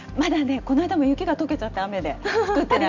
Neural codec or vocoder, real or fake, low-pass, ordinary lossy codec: none; real; 7.2 kHz; none